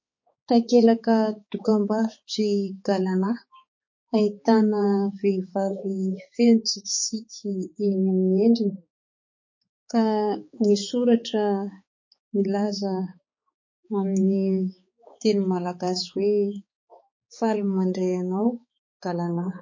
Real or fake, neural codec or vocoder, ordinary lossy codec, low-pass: fake; codec, 16 kHz, 4 kbps, X-Codec, HuBERT features, trained on balanced general audio; MP3, 32 kbps; 7.2 kHz